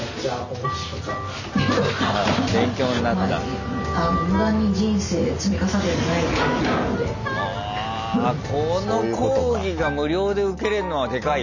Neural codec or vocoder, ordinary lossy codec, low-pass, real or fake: none; none; 7.2 kHz; real